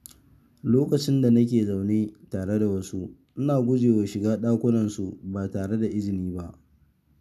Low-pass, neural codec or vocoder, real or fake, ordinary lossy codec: 14.4 kHz; none; real; none